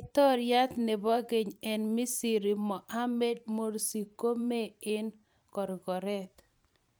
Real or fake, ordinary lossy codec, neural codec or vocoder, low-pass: fake; none; vocoder, 44.1 kHz, 128 mel bands every 512 samples, BigVGAN v2; none